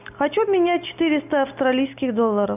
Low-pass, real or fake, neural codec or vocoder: 3.6 kHz; real; none